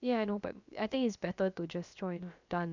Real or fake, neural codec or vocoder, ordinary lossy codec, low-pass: fake; codec, 16 kHz, about 1 kbps, DyCAST, with the encoder's durations; none; 7.2 kHz